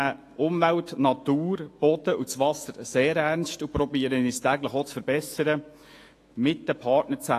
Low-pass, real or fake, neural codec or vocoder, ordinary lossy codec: 14.4 kHz; fake; autoencoder, 48 kHz, 128 numbers a frame, DAC-VAE, trained on Japanese speech; AAC, 48 kbps